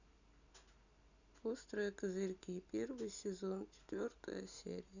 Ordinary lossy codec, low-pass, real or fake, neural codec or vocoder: none; 7.2 kHz; real; none